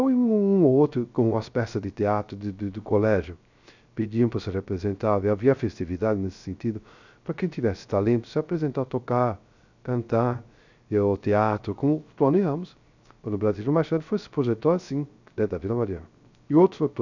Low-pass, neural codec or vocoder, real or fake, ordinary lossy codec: 7.2 kHz; codec, 16 kHz, 0.3 kbps, FocalCodec; fake; none